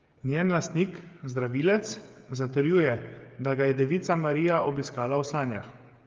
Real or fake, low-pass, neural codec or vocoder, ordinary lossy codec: fake; 7.2 kHz; codec, 16 kHz, 8 kbps, FreqCodec, smaller model; Opus, 32 kbps